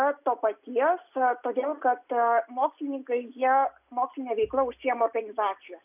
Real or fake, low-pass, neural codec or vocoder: real; 3.6 kHz; none